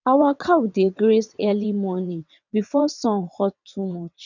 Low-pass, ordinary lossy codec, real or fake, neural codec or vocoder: 7.2 kHz; none; fake; vocoder, 22.05 kHz, 80 mel bands, WaveNeXt